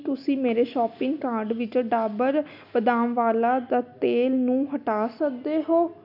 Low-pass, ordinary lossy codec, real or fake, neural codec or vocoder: 5.4 kHz; none; real; none